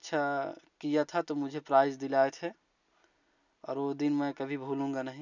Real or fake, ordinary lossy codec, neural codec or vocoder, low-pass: real; none; none; 7.2 kHz